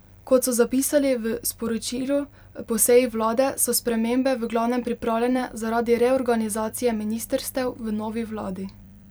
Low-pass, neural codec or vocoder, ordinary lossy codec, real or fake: none; vocoder, 44.1 kHz, 128 mel bands every 256 samples, BigVGAN v2; none; fake